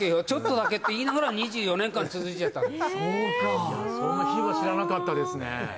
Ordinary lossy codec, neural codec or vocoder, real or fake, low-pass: none; none; real; none